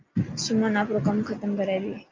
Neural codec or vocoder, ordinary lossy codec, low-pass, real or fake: none; Opus, 24 kbps; 7.2 kHz; real